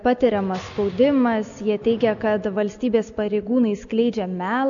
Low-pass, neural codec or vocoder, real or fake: 7.2 kHz; none; real